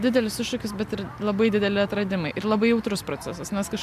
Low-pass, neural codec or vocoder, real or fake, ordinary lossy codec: 14.4 kHz; none; real; MP3, 96 kbps